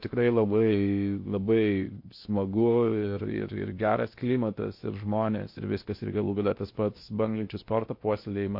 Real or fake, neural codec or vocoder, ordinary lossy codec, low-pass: fake; codec, 16 kHz in and 24 kHz out, 0.8 kbps, FocalCodec, streaming, 65536 codes; MP3, 32 kbps; 5.4 kHz